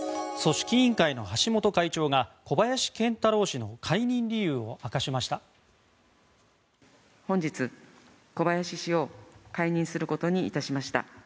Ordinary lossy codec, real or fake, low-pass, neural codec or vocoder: none; real; none; none